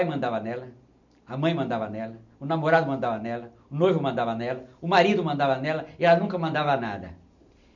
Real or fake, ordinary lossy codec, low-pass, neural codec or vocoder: real; none; 7.2 kHz; none